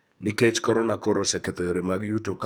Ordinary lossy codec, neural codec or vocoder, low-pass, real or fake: none; codec, 44.1 kHz, 2.6 kbps, SNAC; none; fake